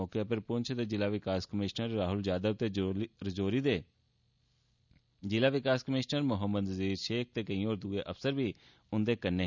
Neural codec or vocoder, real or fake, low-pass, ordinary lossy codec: none; real; 7.2 kHz; none